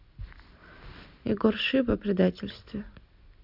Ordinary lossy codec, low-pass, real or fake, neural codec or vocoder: none; 5.4 kHz; real; none